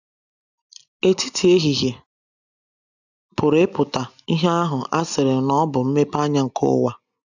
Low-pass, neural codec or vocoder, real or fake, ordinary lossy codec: 7.2 kHz; none; real; none